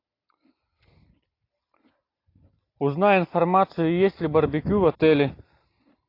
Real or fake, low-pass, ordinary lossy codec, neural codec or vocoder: real; 5.4 kHz; AAC, 32 kbps; none